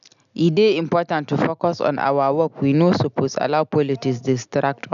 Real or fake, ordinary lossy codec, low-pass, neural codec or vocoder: real; none; 7.2 kHz; none